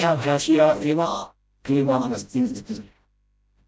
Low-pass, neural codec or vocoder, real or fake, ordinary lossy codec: none; codec, 16 kHz, 0.5 kbps, FreqCodec, smaller model; fake; none